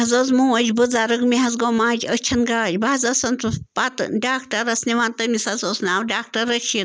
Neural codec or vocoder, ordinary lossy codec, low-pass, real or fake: none; none; none; real